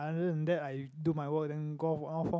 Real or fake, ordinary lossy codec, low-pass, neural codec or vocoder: real; none; none; none